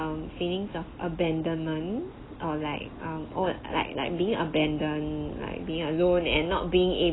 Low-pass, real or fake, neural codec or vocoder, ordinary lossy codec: 7.2 kHz; real; none; AAC, 16 kbps